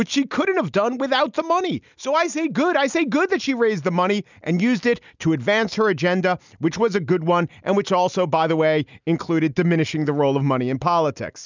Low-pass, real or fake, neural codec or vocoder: 7.2 kHz; real; none